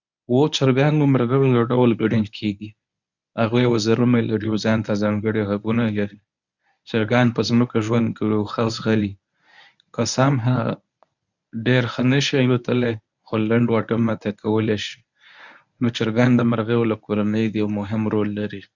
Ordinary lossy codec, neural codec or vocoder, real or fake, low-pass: none; codec, 24 kHz, 0.9 kbps, WavTokenizer, medium speech release version 1; fake; 7.2 kHz